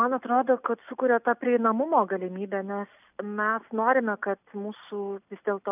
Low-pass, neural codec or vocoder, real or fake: 3.6 kHz; none; real